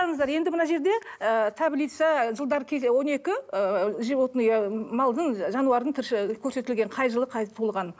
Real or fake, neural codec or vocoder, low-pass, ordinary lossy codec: real; none; none; none